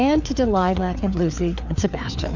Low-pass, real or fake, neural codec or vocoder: 7.2 kHz; fake; codec, 16 kHz, 4 kbps, FreqCodec, larger model